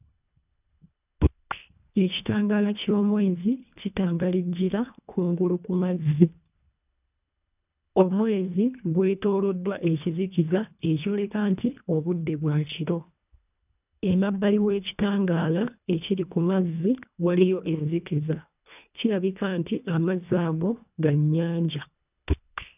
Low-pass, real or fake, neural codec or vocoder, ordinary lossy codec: 3.6 kHz; fake; codec, 24 kHz, 1.5 kbps, HILCodec; none